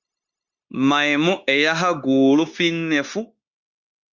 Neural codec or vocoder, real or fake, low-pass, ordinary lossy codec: codec, 16 kHz, 0.9 kbps, LongCat-Audio-Codec; fake; 7.2 kHz; Opus, 64 kbps